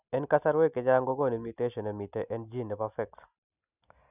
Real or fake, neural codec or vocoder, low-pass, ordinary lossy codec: real; none; 3.6 kHz; none